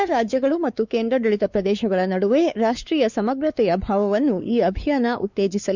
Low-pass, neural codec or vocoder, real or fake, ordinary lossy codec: 7.2 kHz; codec, 16 kHz, 4 kbps, X-Codec, WavLM features, trained on Multilingual LibriSpeech; fake; Opus, 64 kbps